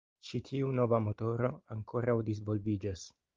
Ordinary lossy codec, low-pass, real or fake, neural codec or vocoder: Opus, 32 kbps; 7.2 kHz; real; none